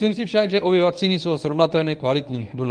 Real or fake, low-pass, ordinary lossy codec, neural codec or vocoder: fake; 9.9 kHz; Opus, 32 kbps; codec, 24 kHz, 0.9 kbps, WavTokenizer, medium speech release version 1